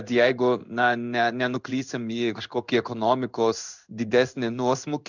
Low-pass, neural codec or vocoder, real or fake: 7.2 kHz; codec, 16 kHz in and 24 kHz out, 1 kbps, XY-Tokenizer; fake